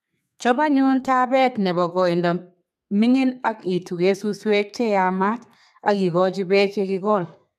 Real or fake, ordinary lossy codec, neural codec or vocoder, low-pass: fake; none; codec, 32 kHz, 1.9 kbps, SNAC; 14.4 kHz